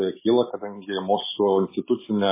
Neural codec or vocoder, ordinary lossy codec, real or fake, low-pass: none; MP3, 16 kbps; real; 3.6 kHz